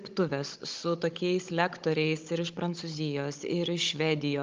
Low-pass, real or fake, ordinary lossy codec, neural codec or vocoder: 7.2 kHz; fake; Opus, 32 kbps; codec, 16 kHz, 16 kbps, FunCodec, trained on Chinese and English, 50 frames a second